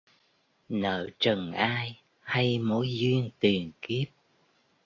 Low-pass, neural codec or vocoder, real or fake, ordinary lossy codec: 7.2 kHz; none; real; AAC, 32 kbps